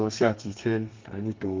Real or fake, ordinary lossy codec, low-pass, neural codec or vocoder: fake; Opus, 24 kbps; 7.2 kHz; codec, 32 kHz, 1.9 kbps, SNAC